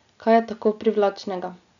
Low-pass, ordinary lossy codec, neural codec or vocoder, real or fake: 7.2 kHz; none; none; real